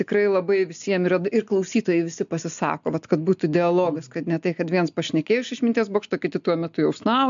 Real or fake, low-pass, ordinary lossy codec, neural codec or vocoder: real; 7.2 kHz; MP3, 64 kbps; none